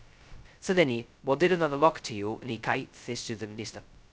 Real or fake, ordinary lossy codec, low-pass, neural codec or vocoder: fake; none; none; codec, 16 kHz, 0.2 kbps, FocalCodec